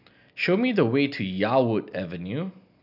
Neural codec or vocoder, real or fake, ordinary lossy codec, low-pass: none; real; none; 5.4 kHz